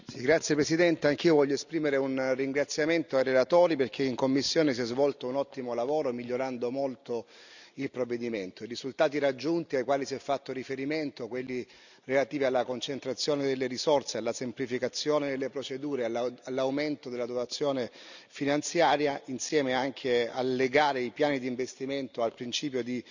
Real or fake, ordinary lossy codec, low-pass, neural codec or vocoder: real; none; 7.2 kHz; none